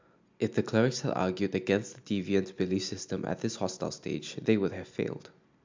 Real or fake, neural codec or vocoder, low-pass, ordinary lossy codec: real; none; 7.2 kHz; MP3, 64 kbps